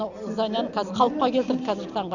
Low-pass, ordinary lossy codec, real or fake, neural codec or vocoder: 7.2 kHz; none; real; none